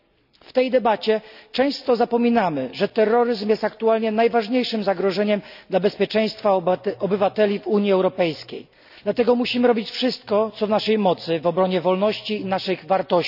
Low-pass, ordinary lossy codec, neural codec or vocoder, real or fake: 5.4 kHz; none; none; real